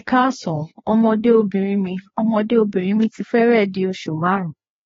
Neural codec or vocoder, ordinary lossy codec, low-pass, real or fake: codec, 16 kHz, 2 kbps, FreqCodec, larger model; AAC, 24 kbps; 7.2 kHz; fake